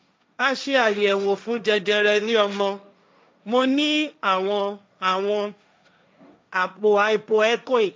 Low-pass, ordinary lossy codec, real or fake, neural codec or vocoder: none; none; fake; codec, 16 kHz, 1.1 kbps, Voila-Tokenizer